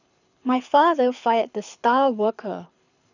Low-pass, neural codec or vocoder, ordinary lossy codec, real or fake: 7.2 kHz; codec, 24 kHz, 6 kbps, HILCodec; none; fake